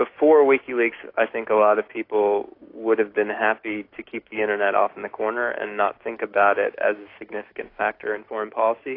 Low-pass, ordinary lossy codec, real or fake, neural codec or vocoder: 5.4 kHz; AAC, 32 kbps; fake; codec, 16 kHz, 6 kbps, DAC